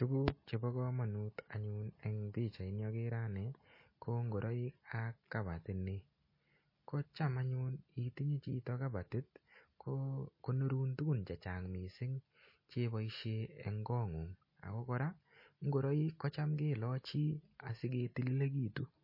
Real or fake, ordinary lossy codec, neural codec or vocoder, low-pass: real; MP3, 24 kbps; none; 5.4 kHz